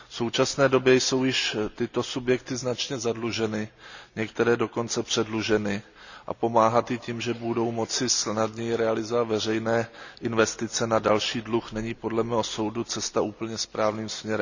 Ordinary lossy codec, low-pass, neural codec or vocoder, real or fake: none; 7.2 kHz; none; real